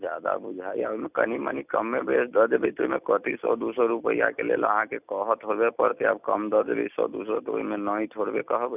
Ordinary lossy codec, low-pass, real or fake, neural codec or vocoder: none; 3.6 kHz; real; none